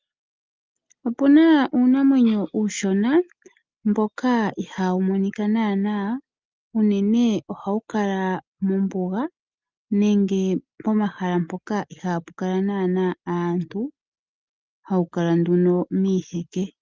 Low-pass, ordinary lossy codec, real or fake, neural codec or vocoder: 7.2 kHz; Opus, 24 kbps; real; none